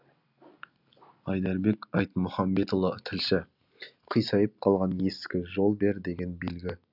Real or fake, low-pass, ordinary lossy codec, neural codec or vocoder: real; 5.4 kHz; none; none